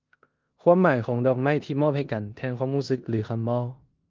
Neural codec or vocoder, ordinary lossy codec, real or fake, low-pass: codec, 16 kHz in and 24 kHz out, 0.9 kbps, LongCat-Audio-Codec, four codebook decoder; Opus, 24 kbps; fake; 7.2 kHz